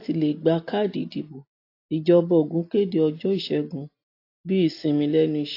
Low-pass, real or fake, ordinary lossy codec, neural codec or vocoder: 5.4 kHz; real; AAC, 32 kbps; none